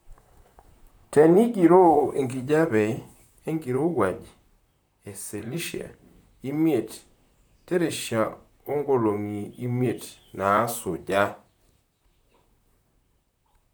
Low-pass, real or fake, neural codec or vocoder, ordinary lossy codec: none; fake; vocoder, 44.1 kHz, 128 mel bands, Pupu-Vocoder; none